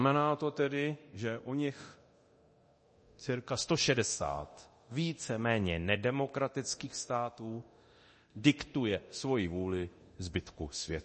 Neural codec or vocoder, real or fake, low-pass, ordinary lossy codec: codec, 24 kHz, 0.9 kbps, DualCodec; fake; 10.8 kHz; MP3, 32 kbps